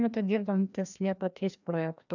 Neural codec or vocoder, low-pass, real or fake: codec, 16 kHz, 1 kbps, FreqCodec, larger model; 7.2 kHz; fake